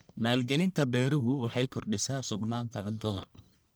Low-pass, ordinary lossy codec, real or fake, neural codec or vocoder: none; none; fake; codec, 44.1 kHz, 1.7 kbps, Pupu-Codec